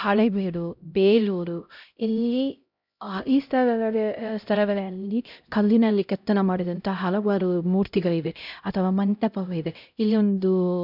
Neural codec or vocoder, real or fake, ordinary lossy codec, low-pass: codec, 16 kHz, 0.5 kbps, X-Codec, HuBERT features, trained on LibriSpeech; fake; none; 5.4 kHz